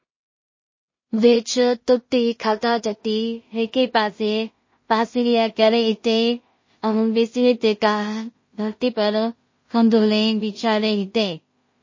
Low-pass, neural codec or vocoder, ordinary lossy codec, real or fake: 7.2 kHz; codec, 16 kHz in and 24 kHz out, 0.4 kbps, LongCat-Audio-Codec, two codebook decoder; MP3, 32 kbps; fake